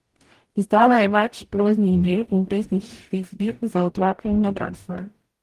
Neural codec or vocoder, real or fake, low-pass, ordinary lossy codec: codec, 44.1 kHz, 0.9 kbps, DAC; fake; 14.4 kHz; Opus, 24 kbps